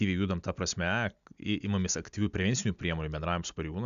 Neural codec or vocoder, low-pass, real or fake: none; 7.2 kHz; real